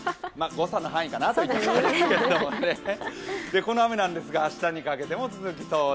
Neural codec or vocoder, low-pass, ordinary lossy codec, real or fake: none; none; none; real